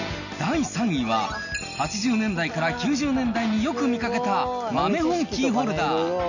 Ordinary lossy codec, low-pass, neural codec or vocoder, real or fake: none; 7.2 kHz; none; real